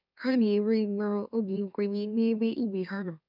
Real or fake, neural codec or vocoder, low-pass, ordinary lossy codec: fake; autoencoder, 44.1 kHz, a latent of 192 numbers a frame, MeloTTS; 5.4 kHz; none